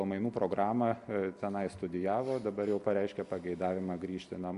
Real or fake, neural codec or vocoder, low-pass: real; none; 10.8 kHz